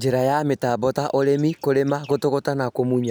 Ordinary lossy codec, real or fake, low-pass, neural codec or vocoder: none; real; none; none